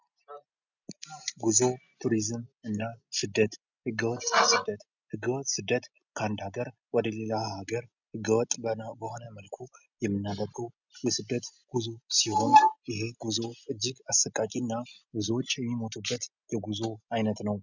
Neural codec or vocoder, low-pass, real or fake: none; 7.2 kHz; real